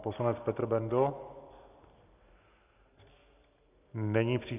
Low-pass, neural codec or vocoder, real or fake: 3.6 kHz; none; real